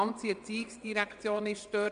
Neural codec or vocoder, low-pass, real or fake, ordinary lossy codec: vocoder, 22.05 kHz, 80 mel bands, WaveNeXt; 9.9 kHz; fake; MP3, 64 kbps